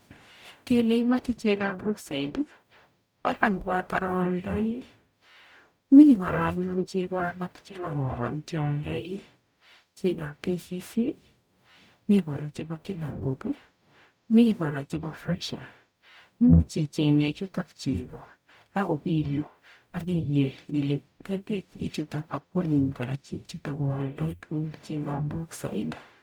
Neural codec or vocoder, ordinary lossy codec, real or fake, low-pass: codec, 44.1 kHz, 0.9 kbps, DAC; none; fake; none